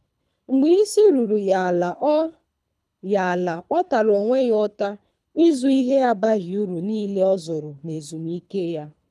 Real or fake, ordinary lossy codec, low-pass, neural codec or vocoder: fake; none; none; codec, 24 kHz, 3 kbps, HILCodec